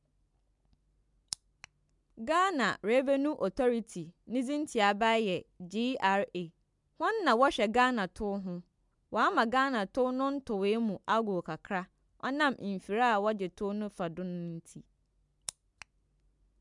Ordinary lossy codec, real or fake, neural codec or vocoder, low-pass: MP3, 96 kbps; real; none; 10.8 kHz